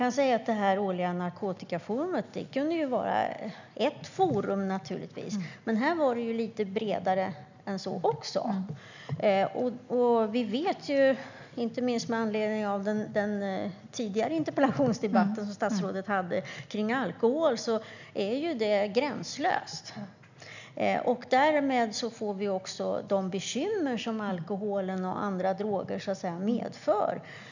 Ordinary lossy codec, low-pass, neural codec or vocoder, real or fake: none; 7.2 kHz; none; real